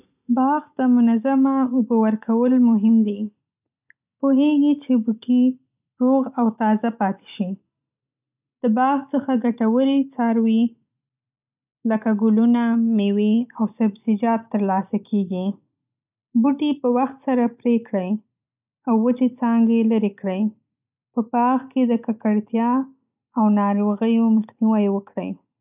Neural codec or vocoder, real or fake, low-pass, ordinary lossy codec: none; real; 3.6 kHz; none